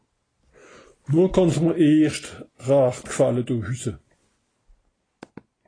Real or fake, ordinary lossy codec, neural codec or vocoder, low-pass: real; AAC, 32 kbps; none; 9.9 kHz